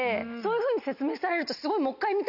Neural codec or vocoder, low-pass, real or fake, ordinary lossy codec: none; 5.4 kHz; real; none